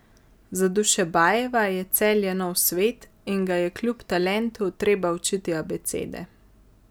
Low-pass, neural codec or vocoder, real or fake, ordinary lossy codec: none; none; real; none